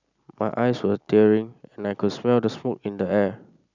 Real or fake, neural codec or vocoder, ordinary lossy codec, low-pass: real; none; none; 7.2 kHz